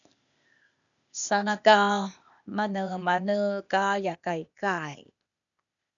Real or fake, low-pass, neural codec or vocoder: fake; 7.2 kHz; codec, 16 kHz, 0.8 kbps, ZipCodec